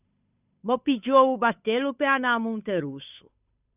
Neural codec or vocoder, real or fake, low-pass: codec, 16 kHz, 8 kbps, FunCodec, trained on Chinese and English, 25 frames a second; fake; 3.6 kHz